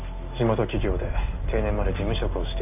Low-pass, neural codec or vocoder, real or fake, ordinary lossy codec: 3.6 kHz; none; real; AAC, 32 kbps